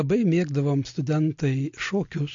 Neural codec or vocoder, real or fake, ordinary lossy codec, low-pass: none; real; AAC, 48 kbps; 7.2 kHz